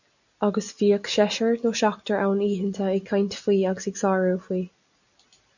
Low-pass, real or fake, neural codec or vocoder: 7.2 kHz; real; none